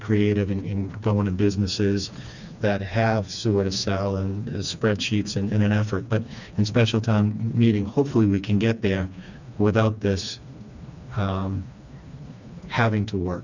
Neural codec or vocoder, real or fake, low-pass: codec, 16 kHz, 2 kbps, FreqCodec, smaller model; fake; 7.2 kHz